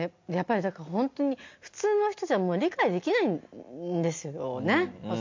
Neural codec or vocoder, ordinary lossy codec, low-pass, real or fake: none; MP3, 48 kbps; 7.2 kHz; real